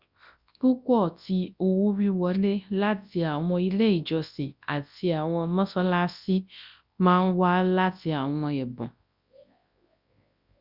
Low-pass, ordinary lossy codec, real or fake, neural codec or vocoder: 5.4 kHz; Opus, 64 kbps; fake; codec, 24 kHz, 0.9 kbps, WavTokenizer, large speech release